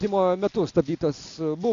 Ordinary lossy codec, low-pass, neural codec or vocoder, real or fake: Opus, 64 kbps; 7.2 kHz; none; real